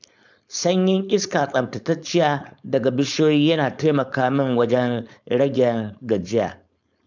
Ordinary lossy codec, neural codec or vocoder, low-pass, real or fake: none; codec, 16 kHz, 4.8 kbps, FACodec; 7.2 kHz; fake